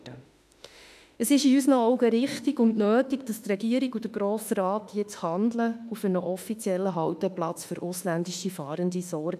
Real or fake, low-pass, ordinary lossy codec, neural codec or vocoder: fake; 14.4 kHz; none; autoencoder, 48 kHz, 32 numbers a frame, DAC-VAE, trained on Japanese speech